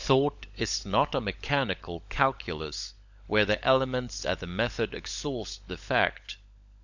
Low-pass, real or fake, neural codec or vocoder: 7.2 kHz; fake; codec, 16 kHz, 16 kbps, FunCodec, trained on LibriTTS, 50 frames a second